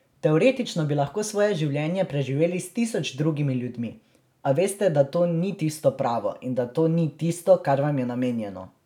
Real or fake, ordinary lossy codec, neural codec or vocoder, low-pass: real; none; none; 19.8 kHz